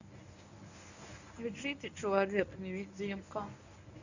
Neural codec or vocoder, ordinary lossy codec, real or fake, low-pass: codec, 24 kHz, 0.9 kbps, WavTokenizer, medium speech release version 1; none; fake; 7.2 kHz